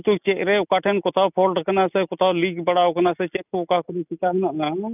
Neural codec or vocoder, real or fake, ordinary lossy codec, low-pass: none; real; none; 3.6 kHz